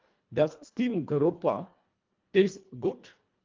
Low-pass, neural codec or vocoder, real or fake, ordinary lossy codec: 7.2 kHz; codec, 24 kHz, 1.5 kbps, HILCodec; fake; Opus, 32 kbps